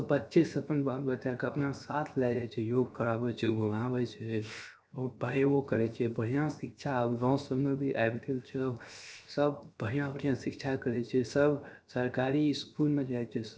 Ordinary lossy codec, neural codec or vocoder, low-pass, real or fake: none; codec, 16 kHz, 0.7 kbps, FocalCodec; none; fake